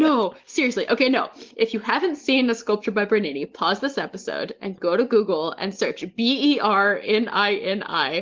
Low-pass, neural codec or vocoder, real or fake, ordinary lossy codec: 7.2 kHz; none; real; Opus, 16 kbps